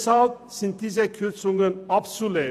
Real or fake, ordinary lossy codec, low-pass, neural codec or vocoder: fake; none; 14.4 kHz; vocoder, 48 kHz, 128 mel bands, Vocos